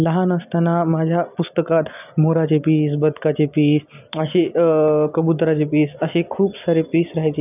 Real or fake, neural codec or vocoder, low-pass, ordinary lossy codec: real; none; 3.6 kHz; none